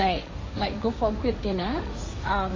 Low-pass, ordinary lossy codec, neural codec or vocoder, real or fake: 7.2 kHz; MP3, 32 kbps; codec, 16 kHz, 1.1 kbps, Voila-Tokenizer; fake